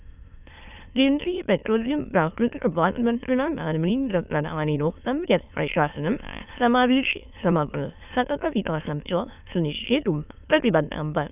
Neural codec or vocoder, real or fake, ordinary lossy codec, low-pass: autoencoder, 22.05 kHz, a latent of 192 numbers a frame, VITS, trained on many speakers; fake; none; 3.6 kHz